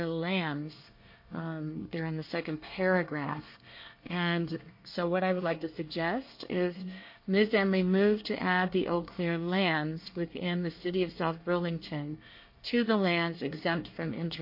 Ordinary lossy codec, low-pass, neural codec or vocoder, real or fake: MP3, 32 kbps; 5.4 kHz; codec, 24 kHz, 1 kbps, SNAC; fake